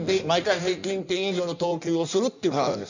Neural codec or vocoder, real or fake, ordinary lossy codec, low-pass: codec, 16 kHz in and 24 kHz out, 1.1 kbps, FireRedTTS-2 codec; fake; none; 7.2 kHz